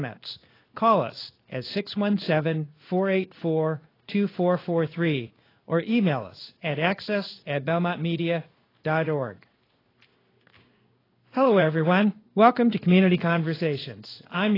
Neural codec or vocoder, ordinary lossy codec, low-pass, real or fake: codec, 16 kHz in and 24 kHz out, 1 kbps, XY-Tokenizer; AAC, 24 kbps; 5.4 kHz; fake